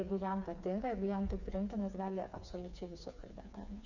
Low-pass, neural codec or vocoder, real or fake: 7.2 kHz; codec, 16 kHz, 4 kbps, FreqCodec, smaller model; fake